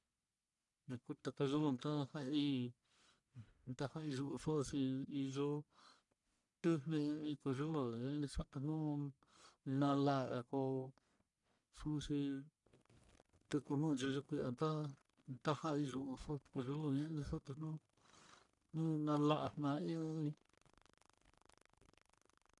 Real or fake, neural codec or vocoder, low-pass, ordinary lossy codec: fake; codec, 44.1 kHz, 1.7 kbps, Pupu-Codec; 10.8 kHz; none